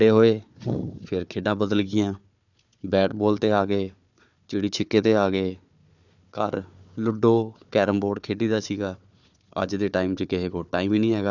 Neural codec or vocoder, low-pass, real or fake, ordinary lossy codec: codec, 16 kHz, 4 kbps, FunCodec, trained on Chinese and English, 50 frames a second; 7.2 kHz; fake; none